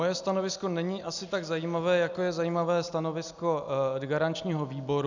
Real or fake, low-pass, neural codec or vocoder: real; 7.2 kHz; none